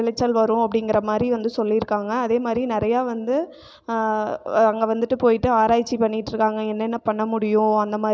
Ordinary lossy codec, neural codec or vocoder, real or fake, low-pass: none; none; real; none